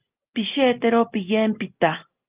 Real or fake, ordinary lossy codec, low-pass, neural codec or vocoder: real; Opus, 32 kbps; 3.6 kHz; none